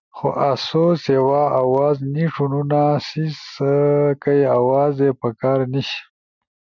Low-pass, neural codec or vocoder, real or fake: 7.2 kHz; none; real